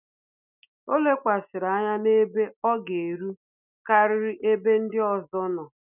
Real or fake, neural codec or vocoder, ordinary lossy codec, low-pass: real; none; none; 3.6 kHz